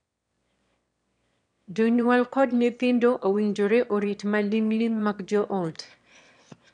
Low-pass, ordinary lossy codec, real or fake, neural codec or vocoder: 9.9 kHz; none; fake; autoencoder, 22.05 kHz, a latent of 192 numbers a frame, VITS, trained on one speaker